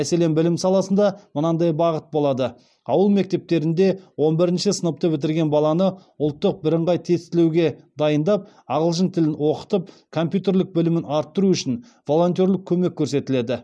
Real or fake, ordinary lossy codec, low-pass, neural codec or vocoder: real; none; none; none